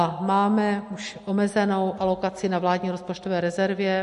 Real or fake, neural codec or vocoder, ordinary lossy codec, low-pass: real; none; MP3, 48 kbps; 14.4 kHz